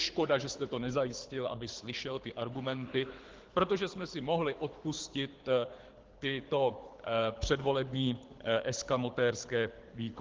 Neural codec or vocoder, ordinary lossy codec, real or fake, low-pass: codec, 24 kHz, 6 kbps, HILCodec; Opus, 16 kbps; fake; 7.2 kHz